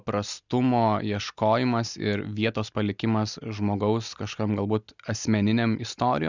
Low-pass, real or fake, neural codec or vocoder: 7.2 kHz; real; none